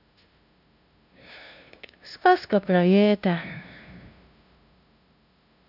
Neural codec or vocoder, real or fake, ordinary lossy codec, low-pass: codec, 16 kHz, 0.5 kbps, FunCodec, trained on LibriTTS, 25 frames a second; fake; none; 5.4 kHz